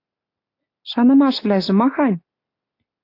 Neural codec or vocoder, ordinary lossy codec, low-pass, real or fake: none; AAC, 32 kbps; 5.4 kHz; real